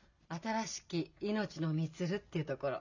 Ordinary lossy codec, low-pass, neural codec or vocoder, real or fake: none; 7.2 kHz; none; real